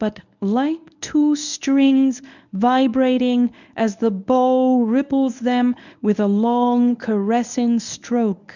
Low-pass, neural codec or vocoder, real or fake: 7.2 kHz; codec, 24 kHz, 0.9 kbps, WavTokenizer, medium speech release version 1; fake